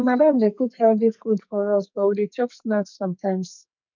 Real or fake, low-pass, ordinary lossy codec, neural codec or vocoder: fake; 7.2 kHz; none; codec, 44.1 kHz, 2.6 kbps, SNAC